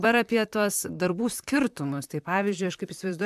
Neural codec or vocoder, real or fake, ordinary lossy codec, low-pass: vocoder, 44.1 kHz, 128 mel bands, Pupu-Vocoder; fake; MP3, 96 kbps; 14.4 kHz